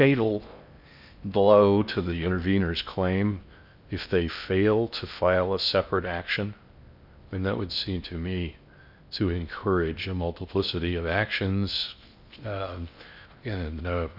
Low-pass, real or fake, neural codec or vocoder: 5.4 kHz; fake; codec, 16 kHz in and 24 kHz out, 0.6 kbps, FocalCodec, streaming, 4096 codes